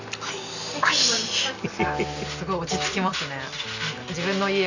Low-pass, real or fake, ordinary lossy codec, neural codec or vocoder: 7.2 kHz; real; none; none